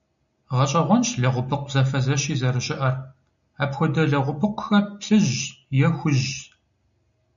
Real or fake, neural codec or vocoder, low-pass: real; none; 7.2 kHz